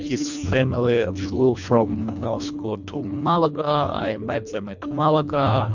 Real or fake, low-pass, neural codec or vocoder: fake; 7.2 kHz; codec, 24 kHz, 1.5 kbps, HILCodec